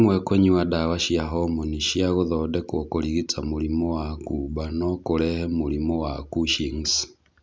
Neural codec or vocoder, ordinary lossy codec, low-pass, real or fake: none; none; none; real